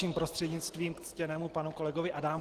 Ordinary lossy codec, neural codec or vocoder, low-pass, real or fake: Opus, 16 kbps; none; 14.4 kHz; real